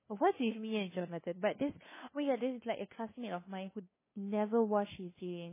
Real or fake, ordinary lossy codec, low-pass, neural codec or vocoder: fake; MP3, 16 kbps; 3.6 kHz; codec, 16 kHz, 2 kbps, FunCodec, trained on LibriTTS, 25 frames a second